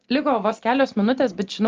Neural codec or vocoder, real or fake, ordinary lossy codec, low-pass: none; real; Opus, 24 kbps; 7.2 kHz